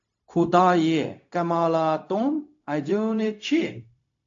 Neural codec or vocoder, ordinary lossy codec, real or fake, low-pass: codec, 16 kHz, 0.4 kbps, LongCat-Audio-Codec; AAC, 48 kbps; fake; 7.2 kHz